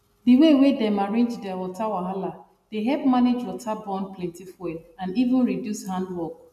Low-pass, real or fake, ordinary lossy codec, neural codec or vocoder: 14.4 kHz; real; none; none